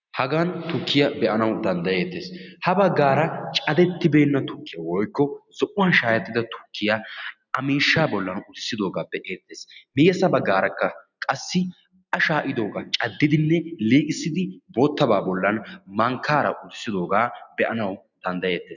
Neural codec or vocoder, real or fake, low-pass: none; real; 7.2 kHz